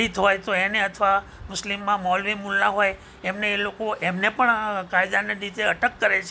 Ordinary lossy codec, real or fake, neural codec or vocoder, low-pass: none; real; none; none